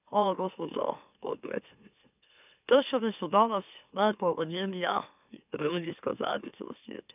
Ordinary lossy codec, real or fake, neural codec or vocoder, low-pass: none; fake; autoencoder, 44.1 kHz, a latent of 192 numbers a frame, MeloTTS; 3.6 kHz